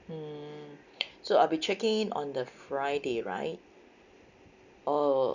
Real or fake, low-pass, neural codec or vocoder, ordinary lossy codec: real; 7.2 kHz; none; none